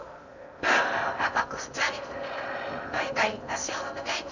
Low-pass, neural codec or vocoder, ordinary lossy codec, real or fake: 7.2 kHz; codec, 16 kHz in and 24 kHz out, 0.6 kbps, FocalCodec, streaming, 2048 codes; none; fake